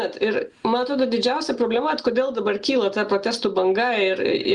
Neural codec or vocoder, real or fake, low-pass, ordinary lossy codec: none; real; 10.8 kHz; Opus, 32 kbps